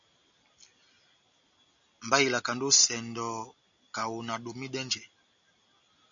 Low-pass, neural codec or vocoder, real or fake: 7.2 kHz; none; real